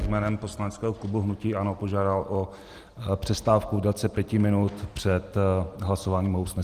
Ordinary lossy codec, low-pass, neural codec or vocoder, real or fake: Opus, 24 kbps; 14.4 kHz; none; real